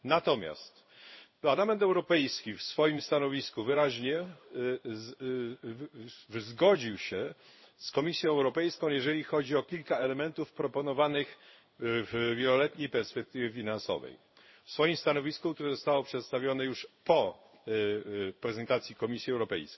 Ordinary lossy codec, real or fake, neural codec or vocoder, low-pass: MP3, 24 kbps; fake; codec, 16 kHz in and 24 kHz out, 1 kbps, XY-Tokenizer; 7.2 kHz